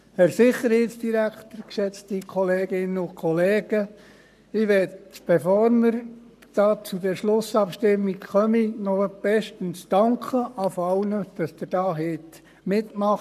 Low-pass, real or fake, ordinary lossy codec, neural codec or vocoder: 14.4 kHz; fake; none; codec, 44.1 kHz, 7.8 kbps, Pupu-Codec